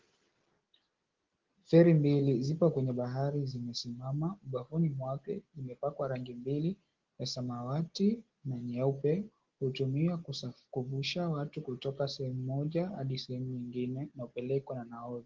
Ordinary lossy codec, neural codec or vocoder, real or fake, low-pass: Opus, 16 kbps; none; real; 7.2 kHz